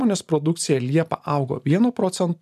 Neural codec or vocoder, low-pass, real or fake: none; 14.4 kHz; real